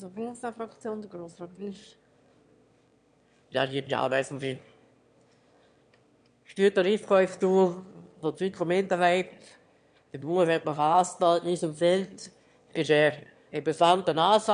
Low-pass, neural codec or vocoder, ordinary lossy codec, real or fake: 9.9 kHz; autoencoder, 22.05 kHz, a latent of 192 numbers a frame, VITS, trained on one speaker; MP3, 64 kbps; fake